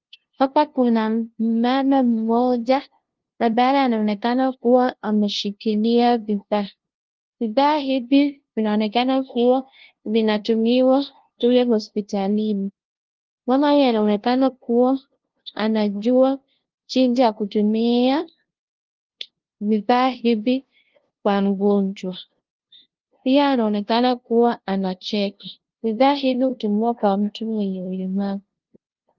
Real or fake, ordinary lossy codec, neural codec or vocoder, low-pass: fake; Opus, 16 kbps; codec, 16 kHz, 0.5 kbps, FunCodec, trained on LibriTTS, 25 frames a second; 7.2 kHz